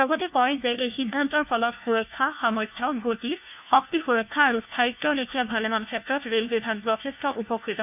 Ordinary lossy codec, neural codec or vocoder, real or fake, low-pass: none; codec, 16 kHz, 1 kbps, FunCodec, trained on LibriTTS, 50 frames a second; fake; 3.6 kHz